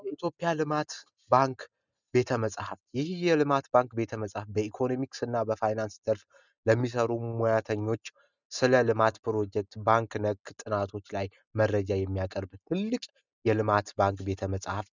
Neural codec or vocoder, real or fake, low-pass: none; real; 7.2 kHz